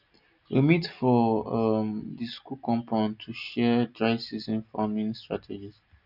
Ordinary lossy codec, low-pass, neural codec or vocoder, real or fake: none; 5.4 kHz; none; real